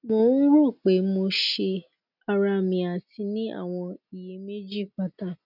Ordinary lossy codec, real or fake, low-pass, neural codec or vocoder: none; real; 5.4 kHz; none